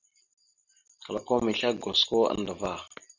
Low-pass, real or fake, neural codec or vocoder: 7.2 kHz; real; none